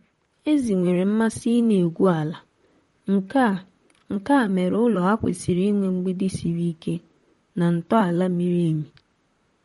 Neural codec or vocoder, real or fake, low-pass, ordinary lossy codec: vocoder, 44.1 kHz, 128 mel bands, Pupu-Vocoder; fake; 19.8 kHz; MP3, 48 kbps